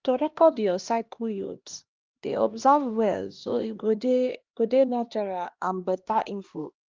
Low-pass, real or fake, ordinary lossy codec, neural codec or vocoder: 7.2 kHz; fake; Opus, 24 kbps; codec, 16 kHz, 1 kbps, X-Codec, HuBERT features, trained on LibriSpeech